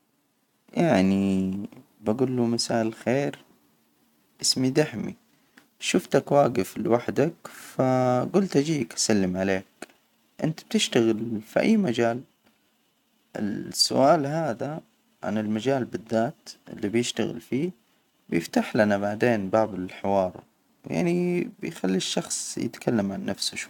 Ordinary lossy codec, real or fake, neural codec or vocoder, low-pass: none; real; none; 19.8 kHz